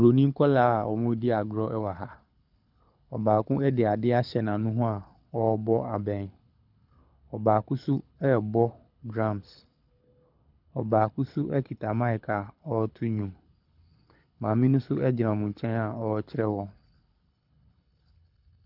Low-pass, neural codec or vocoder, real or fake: 5.4 kHz; codec, 24 kHz, 6 kbps, HILCodec; fake